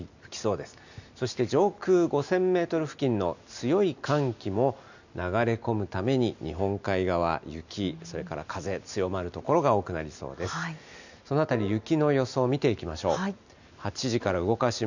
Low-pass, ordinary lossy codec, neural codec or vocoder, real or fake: 7.2 kHz; none; none; real